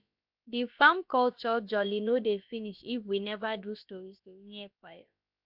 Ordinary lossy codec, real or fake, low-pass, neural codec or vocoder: MP3, 48 kbps; fake; 5.4 kHz; codec, 16 kHz, about 1 kbps, DyCAST, with the encoder's durations